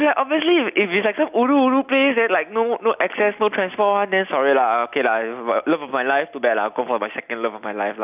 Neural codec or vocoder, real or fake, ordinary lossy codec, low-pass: none; real; none; 3.6 kHz